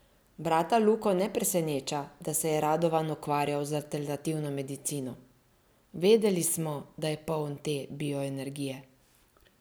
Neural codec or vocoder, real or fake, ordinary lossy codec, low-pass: none; real; none; none